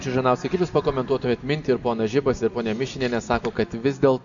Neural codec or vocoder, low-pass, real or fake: none; 7.2 kHz; real